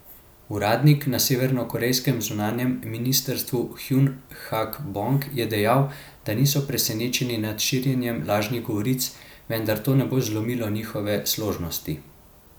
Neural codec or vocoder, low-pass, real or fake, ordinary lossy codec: none; none; real; none